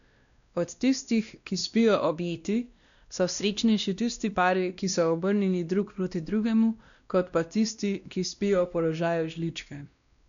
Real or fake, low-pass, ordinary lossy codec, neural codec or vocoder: fake; 7.2 kHz; none; codec, 16 kHz, 1 kbps, X-Codec, WavLM features, trained on Multilingual LibriSpeech